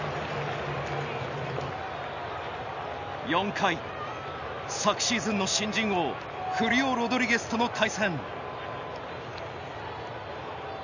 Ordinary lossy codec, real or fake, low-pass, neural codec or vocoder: none; real; 7.2 kHz; none